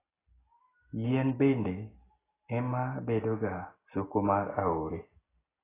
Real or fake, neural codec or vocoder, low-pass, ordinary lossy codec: real; none; 3.6 kHz; AAC, 16 kbps